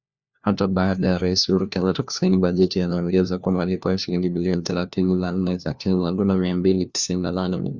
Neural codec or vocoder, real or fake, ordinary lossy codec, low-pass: codec, 16 kHz, 1 kbps, FunCodec, trained on LibriTTS, 50 frames a second; fake; Opus, 64 kbps; 7.2 kHz